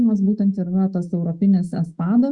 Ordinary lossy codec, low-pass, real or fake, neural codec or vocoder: Opus, 64 kbps; 10.8 kHz; fake; autoencoder, 48 kHz, 128 numbers a frame, DAC-VAE, trained on Japanese speech